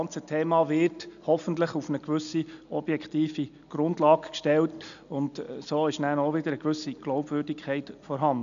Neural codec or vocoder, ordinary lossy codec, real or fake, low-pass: none; none; real; 7.2 kHz